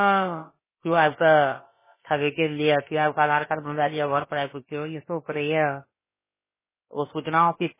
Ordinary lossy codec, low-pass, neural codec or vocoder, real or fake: MP3, 16 kbps; 3.6 kHz; codec, 16 kHz, about 1 kbps, DyCAST, with the encoder's durations; fake